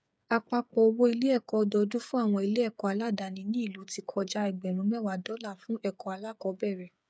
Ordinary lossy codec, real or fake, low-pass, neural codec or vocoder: none; fake; none; codec, 16 kHz, 8 kbps, FreqCodec, smaller model